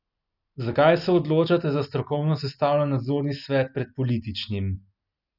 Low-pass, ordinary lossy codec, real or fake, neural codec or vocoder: 5.4 kHz; none; real; none